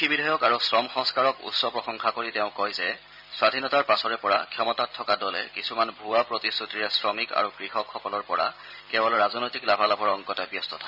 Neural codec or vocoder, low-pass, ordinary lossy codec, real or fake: none; 5.4 kHz; none; real